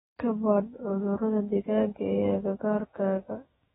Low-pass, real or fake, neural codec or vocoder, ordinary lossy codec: 19.8 kHz; fake; autoencoder, 48 kHz, 128 numbers a frame, DAC-VAE, trained on Japanese speech; AAC, 16 kbps